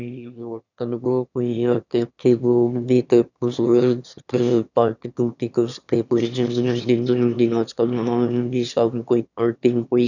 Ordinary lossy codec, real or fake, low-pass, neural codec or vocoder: none; fake; 7.2 kHz; autoencoder, 22.05 kHz, a latent of 192 numbers a frame, VITS, trained on one speaker